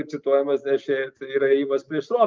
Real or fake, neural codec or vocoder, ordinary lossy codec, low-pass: real; none; Opus, 24 kbps; 7.2 kHz